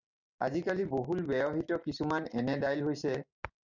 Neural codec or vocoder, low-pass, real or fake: none; 7.2 kHz; real